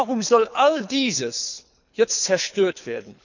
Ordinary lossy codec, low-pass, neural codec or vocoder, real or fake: none; 7.2 kHz; codec, 24 kHz, 3 kbps, HILCodec; fake